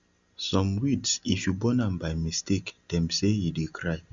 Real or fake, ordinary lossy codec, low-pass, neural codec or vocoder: real; Opus, 64 kbps; 7.2 kHz; none